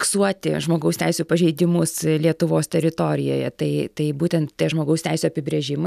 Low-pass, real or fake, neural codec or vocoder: 14.4 kHz; real; none